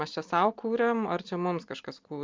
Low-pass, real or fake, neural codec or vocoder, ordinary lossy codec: 7.2 kHz; real; none; Opus, 24 kbps